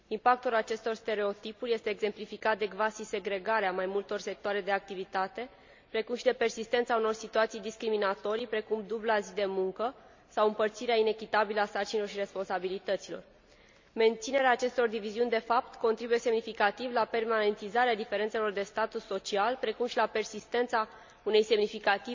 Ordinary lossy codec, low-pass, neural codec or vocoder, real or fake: MP3, 64 kbps; 7.2 kHz; none; real